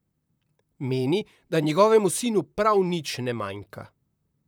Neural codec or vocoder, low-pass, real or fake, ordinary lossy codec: vocoder, 44.1 kHz, 128 mel bands, Pupu-Vocoder; none; fake; none